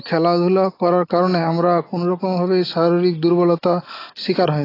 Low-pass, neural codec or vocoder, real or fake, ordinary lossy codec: 5.4 kHz; none; real; AAC, 24 kbps